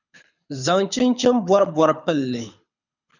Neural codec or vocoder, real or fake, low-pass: codec, 24 kHz, 6 kbps, HILCodec; fake; 7.2 kHz